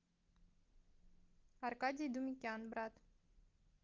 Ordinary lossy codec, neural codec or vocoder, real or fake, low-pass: Opus, 32 kbps; none; real; 7.2 kHz